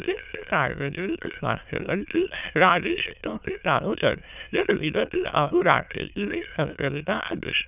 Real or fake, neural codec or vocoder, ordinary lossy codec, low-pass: fake; autoencoder, 22.05 kHz, a latent of 192 numbers a frame, VITS, trained on many speakers; none; 3.6 kHz